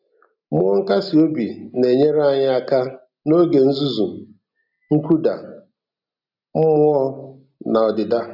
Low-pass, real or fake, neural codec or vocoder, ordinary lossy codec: 5.4 kHz; real; none; none